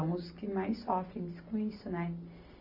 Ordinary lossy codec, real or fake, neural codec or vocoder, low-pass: none; real; none; 5.4 kHz